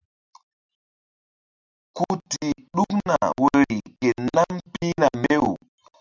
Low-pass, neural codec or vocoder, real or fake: 7.2 kHz; none; real